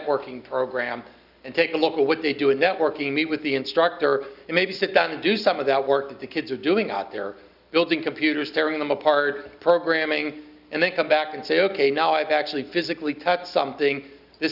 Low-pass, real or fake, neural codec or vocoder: 5.4 kHz; real; none